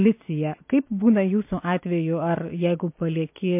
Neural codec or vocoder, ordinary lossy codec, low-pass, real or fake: none; MP3, 24 kbps; 3.6 kHz; real